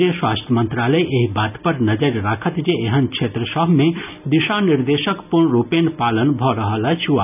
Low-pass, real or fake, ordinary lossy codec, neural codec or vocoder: 3.6 kHz; real; none; none